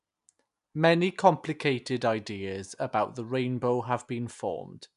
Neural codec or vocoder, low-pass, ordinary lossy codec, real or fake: none; 10.8 kHz; none; real